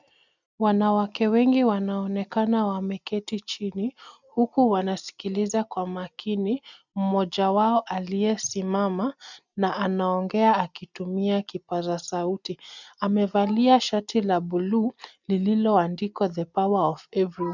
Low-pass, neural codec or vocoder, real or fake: 7.2 kHz; none; real